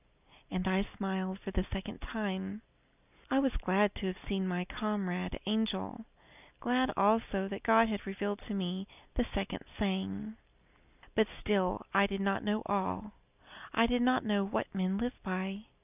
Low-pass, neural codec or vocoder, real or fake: 3.6 kHz; none; real